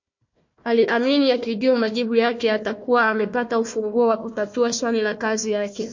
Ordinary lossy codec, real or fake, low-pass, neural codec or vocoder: MP3, 48 kbps; fake; 7.2 kHz; codec, 16 kHz, 1 kbps, FunCodec, trained on Chinese and English, 50 frames a second